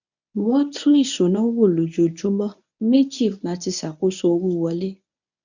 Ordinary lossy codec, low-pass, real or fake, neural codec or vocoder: none; 7.2 kHz; fake; codec, 24 kHz, 0.9 kbps, WavTokenizer, medium speech release version 1